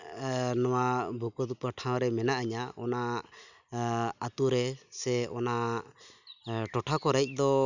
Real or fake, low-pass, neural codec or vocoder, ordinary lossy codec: real; 7.2 kHz; none; none